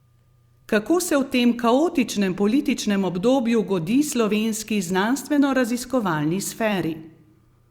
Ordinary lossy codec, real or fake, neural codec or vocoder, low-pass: Opus, 64 kbps; fake; vocoder, 44.1 kHz, 128 mel bands every 256 samples, BigVGAN v2; 19.8 kHz